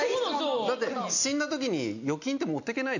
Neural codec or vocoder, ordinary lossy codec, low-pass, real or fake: none; none; 7.2 kHz; real